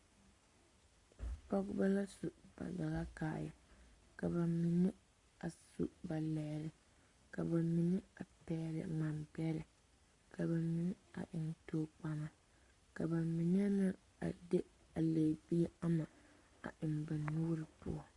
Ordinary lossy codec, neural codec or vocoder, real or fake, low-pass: Opus, 64 kbps; codec, 44.1 kHz, 7.8 kbps, Pupu-Codec; fake; 10.8 kHz